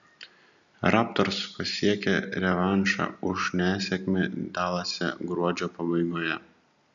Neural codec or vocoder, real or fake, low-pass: none; real; 7.2 kHz